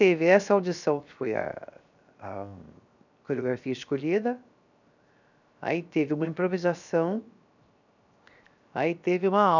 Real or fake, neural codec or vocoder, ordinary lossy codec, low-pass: fake; codec, 16 kHz, 0.7 kbps, FocalCodec; none; 7.2 kHz